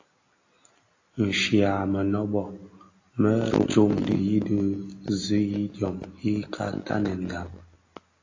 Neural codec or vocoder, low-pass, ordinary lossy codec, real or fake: none; 7.2 kHz; AAC, 32 kbps; real